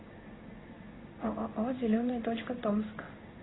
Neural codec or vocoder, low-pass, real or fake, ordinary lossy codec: codec, 16 kHz in and 24 kHz out, 1 kbps, XY-Tokenizer; 7.2 kHz; fake; AAC, 16 kbps